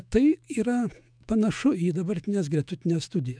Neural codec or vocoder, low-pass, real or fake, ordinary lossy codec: autoencoder, 48 kHz, 128 numbers a frame, DAC-VAE, trained on Japanese speech; 9.9 kHz; fake; MP3, 96 kbps